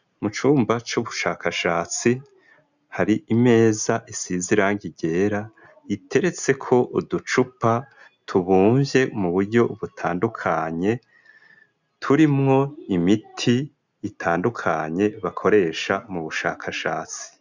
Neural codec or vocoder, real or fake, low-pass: none; real; 7.2 kHz